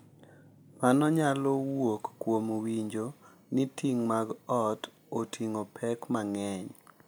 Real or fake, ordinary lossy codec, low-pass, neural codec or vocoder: real; none; none; none